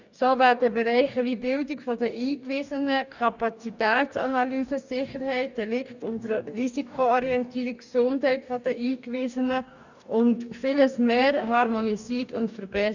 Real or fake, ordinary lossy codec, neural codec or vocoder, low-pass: fake; none; codec, 44.1 kHz, 2.6 kbps, DAC; 7.2 kHz